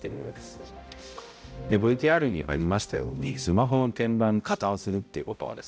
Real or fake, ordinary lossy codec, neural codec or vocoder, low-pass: fake; none; codec, 16 kHz, 0.5 kbps, X-Codec, HuBERT features, trained on balanced general audio; none